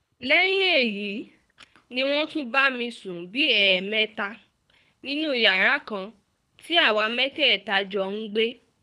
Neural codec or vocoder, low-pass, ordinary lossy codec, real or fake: codec, 24 kHz, 3 kbps, HILCodec; none; none; fake